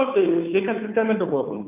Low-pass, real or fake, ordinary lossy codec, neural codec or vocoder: 3.6 kHz; fake; none; codec, 16 kHz, 2 kbps, FunCodec, trained on Chinese and English, 25 frames a second